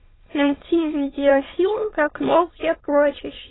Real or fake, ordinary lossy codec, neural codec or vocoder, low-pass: fake; AAC, 16 kbps; autoencoder, 22.05 kHz, a latent of 192 numbers a frame, VITS, trained on many speakers; 7.2 kHz